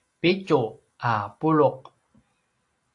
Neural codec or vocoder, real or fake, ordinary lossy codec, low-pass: none; real; AAC, 48 kbps; 10.8 kHz